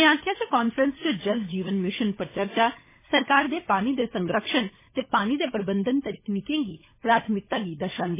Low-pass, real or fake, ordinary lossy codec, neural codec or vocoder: 3.6 kHz; fake; MP3, 16 kbps; codec, 16 kHz, 16 kbps, FunCodec, trained on LibriTTS, 50 frames a second